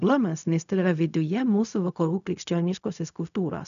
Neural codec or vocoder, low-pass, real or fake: codec, 16 kHz, 0.4 kbps, LongCat-Audio-Codec; 7.2 kHz; fake